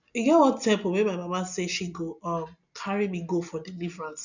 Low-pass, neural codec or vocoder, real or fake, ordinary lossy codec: 7.2 kHz; none; real; none